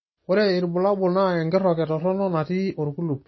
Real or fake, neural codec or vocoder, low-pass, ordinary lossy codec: real; none; 7.2 kHz; MP3, 24 kbps